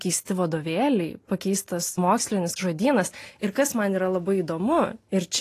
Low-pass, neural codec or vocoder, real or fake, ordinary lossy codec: 14.4 kHz; none; real; AAC, 48 kbps